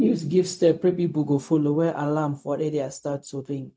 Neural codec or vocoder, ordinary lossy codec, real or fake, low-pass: codec, 16 kHz, 0.4 kbps, LongCat-Audio-Codec; none; fake; none